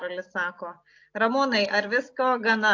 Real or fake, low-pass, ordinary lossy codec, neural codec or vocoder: real; 7.2 kHz; AAC, 48 kbps; none